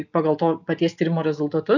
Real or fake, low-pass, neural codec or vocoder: real; 7.2 kHz; none